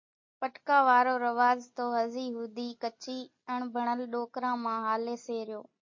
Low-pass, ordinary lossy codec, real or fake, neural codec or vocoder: 7.2 kHz; MP3, 32 kbps; real; none